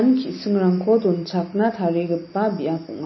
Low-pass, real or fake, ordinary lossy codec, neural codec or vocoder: 7.2 kHz; real; MP3, 24 kbps; none